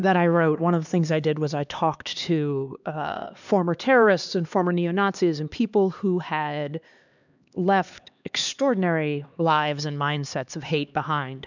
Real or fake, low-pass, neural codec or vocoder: fake; 7.2 kHz; codec, 16 kHz, 2 kbps, X-Codec, HuBERT features, trained on LibriSpeech